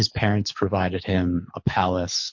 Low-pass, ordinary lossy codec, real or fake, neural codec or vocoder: 7.2 kHz; MP3, 48 kbps; fake; codec, 24 kHz, 6 kbps, HILCodec